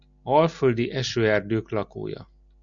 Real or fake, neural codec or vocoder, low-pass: real; none; 7.2 kHz